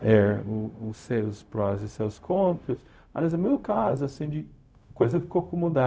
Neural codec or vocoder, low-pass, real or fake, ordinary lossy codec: codec, 16 kHz, 0.4 kbps, LongCat-Audio-Codec; none; fake; none